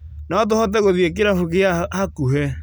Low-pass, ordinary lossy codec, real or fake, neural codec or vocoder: none; none; real; none